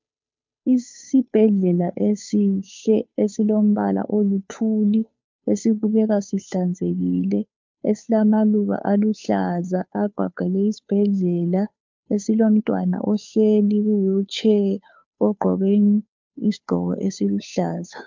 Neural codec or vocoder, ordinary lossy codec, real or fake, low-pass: codec, 16 kHz, 2 kbps, FunCodec, trained on Chinese and English, 25 frames a second; MP3, 64 kbps; fake; 7.2 kHz